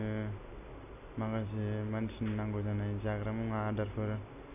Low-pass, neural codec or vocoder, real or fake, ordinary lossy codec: 3.6 kHz; none; real; none